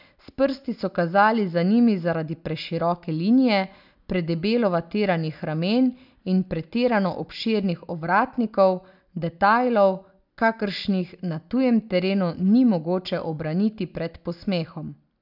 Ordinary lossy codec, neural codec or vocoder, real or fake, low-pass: none; none; real; 5.4 kHz